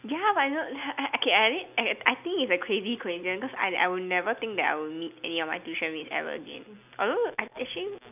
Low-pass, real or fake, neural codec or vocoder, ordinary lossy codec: 3.6 kHz; real; none; none